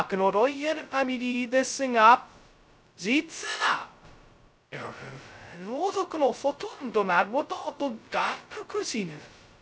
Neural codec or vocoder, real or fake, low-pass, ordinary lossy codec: codec, 16 kHz, 0.2 kbps, FocalCodec; fake; none; none